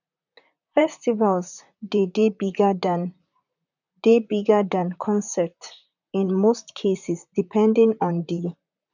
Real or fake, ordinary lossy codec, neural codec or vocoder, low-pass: fake; none; vocoder, 44.1 kHz, 128 mel bands, Pupu-Vocoder; 7.2 kHz